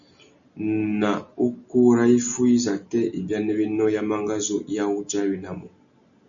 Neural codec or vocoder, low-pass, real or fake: none; 7.2 kHz; real